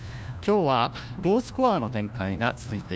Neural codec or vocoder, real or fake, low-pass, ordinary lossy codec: codec, 16 kHz, 1 kbps, FunCodec, trained on LibriTTS, 50 frames a second; fake; none; none